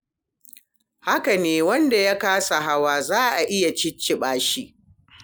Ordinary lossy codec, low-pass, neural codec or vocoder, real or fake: none; none; none; real